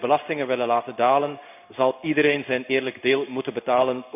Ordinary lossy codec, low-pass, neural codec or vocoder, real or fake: none; 3.6 kHz; codec, 16 kHz in and 24 kHz out, 1 kbps, XY-Tokenizer; fake